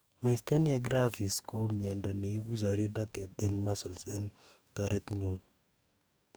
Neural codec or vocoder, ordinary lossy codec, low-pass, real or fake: codec, 44.1 kHz, 2.6 kbps, DAC; none; none; fake